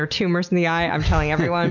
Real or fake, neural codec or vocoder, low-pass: real; none; 7.2 kHz